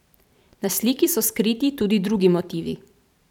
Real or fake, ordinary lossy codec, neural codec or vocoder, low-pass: fake; none; vocoder, 44.1 kHz, 128 mel bands every 512 samples, BigVGAN v2; 19.8 kHz